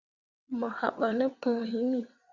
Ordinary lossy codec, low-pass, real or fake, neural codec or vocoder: Opus, 64 kbps; 7.2 kHz; fake; vocoder, 22.05 kHz, 80 mel bands, WaveNeXt